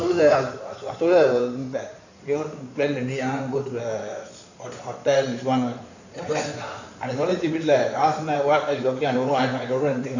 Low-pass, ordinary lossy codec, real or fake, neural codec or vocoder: 7.2 kHz; none; fake; codec, 16 kHz in and 24 kHz out, 2.2 kbps, FireRedTTS-2 codec